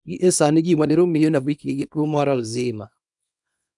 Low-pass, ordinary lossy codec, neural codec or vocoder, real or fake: 10.8 kHz; none; codec, 24 kHz, 0.9 kbps, WavTokenizer, small release; fake